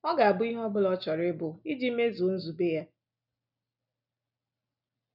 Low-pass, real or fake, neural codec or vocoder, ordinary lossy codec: 5.4 kHz; real; none; none